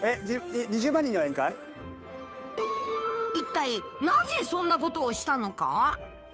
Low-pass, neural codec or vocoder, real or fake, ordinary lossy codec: none; codec, 16 kHz, 2 kbps, FunCodec, trained on Chinese and English, 25 frames a second; fake; none